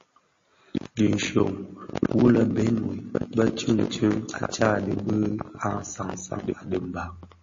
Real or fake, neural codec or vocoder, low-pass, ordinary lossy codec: real; none; 7.2 kHz; MP3, 32 kbps